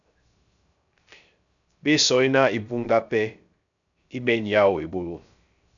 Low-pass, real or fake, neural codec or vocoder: 7.2 kHz; fake; codec, 16 kHz, 0.3 kbps, FocalCodec